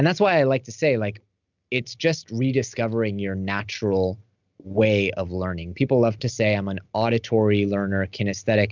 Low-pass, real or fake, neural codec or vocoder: 7.2 kHz; real; none